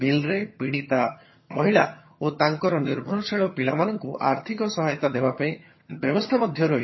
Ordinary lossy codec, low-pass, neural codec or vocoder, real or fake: MP3, 24 kbps; 7.2 kHz; vocoder, 22.05 kHz, 80 mel bands, HiFi-GAN; fake